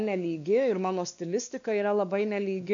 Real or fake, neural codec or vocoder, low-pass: fake; codec, 16 kHz, 2 kbps, X-Codec, WavLM features, trained on Multilingual LibriSpeech; 7.2 kHz